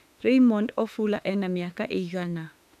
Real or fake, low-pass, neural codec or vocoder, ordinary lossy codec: fake; 14.4 kHz; autoencoder, 48 kHz, 32 numbers a frame, DAC-VAE, trained on Japanese speech; none